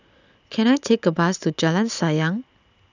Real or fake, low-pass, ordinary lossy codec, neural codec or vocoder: real; 7.2 kHz; none; none